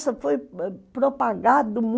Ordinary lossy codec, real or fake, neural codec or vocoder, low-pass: none; real; none; none